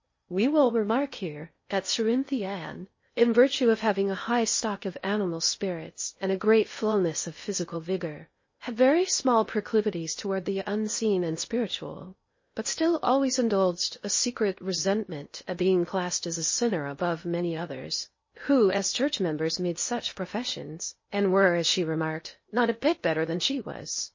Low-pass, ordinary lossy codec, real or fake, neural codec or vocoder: 7.2 kHz; MP3, 32 kbps; fake; codec, 16 kHz in and 24 kHz out, 0.6 kbps, FocalCodec, streaming, 4096 codes